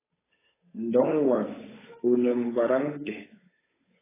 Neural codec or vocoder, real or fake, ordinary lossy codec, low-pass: codec, 16 kHz, 8 kbps, FunCodec, trained on Chinese and English, 25 frames a second; fake; AAC, 16 kbps; 3.6 kHz